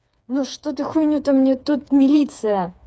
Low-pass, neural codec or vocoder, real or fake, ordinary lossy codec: none; codec, 16 kHz, 4 kbps, FreqCodec, smaller model; fake; none